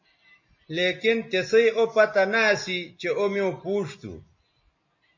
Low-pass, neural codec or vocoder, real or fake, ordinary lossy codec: 7.2 kHz; none; real; MP3, 32 kbps